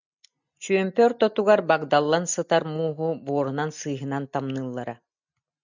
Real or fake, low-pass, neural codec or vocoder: real; 7.2 kHz; none